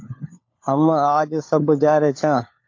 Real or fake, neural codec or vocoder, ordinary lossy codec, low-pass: fake; codec, 16 kHz, 4 kbps, FunCodec, trained on LibriTTS, 50 frames a second; AAC, 48 kbps; 7.2 kHz